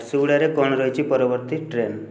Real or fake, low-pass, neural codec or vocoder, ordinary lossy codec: real; none; none; none